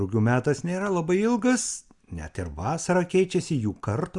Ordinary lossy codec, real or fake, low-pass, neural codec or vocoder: Opus, 64 kbps; real; 10.8 kHz; none